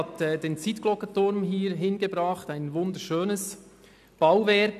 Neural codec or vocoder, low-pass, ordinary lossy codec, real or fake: none; 14.4 kHz; none; real